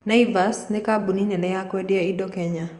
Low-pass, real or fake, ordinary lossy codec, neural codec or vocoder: 10.8 kHz; fake; Opus, 64 kbps; vocoder, 24 kHz, 100 mel bands, Vocos